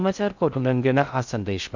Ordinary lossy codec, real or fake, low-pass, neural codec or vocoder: none; fake; 7.2 kHz; codec, 16 kHz in and 24 kHz out, 0.6 kbps, FocalCodec, streaming, 4096 codes